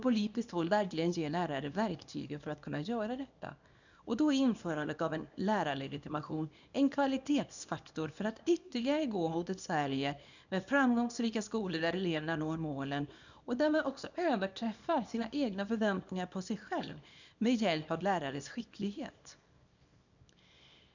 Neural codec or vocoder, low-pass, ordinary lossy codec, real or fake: codec, 24 kHz, 0.9 kbps, WavTokenizer, small release; 7.2 kHz; none; fake